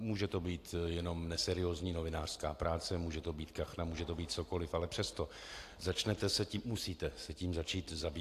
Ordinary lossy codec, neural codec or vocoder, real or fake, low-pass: AAC, 64 kbps; none; real; 14.4 kHz